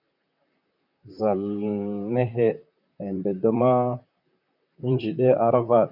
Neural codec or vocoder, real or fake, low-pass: vocoder, 44.1 kHz, 128 mel bands, Pupu-Vocoder; fake; 5.4 kHz